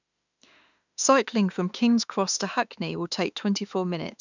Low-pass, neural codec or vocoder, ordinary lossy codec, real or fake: 7.2 kHz; autoencoder, 48 kHz, 32 numbers a frame, DAC-VAE, trained on Japanese speech; none; fake